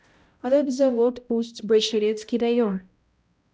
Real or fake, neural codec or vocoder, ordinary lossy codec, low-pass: fake; codec, 16 kHz, 0.5 kbps, X-Codec, HuBERT features, trained on balanced general audio; none; none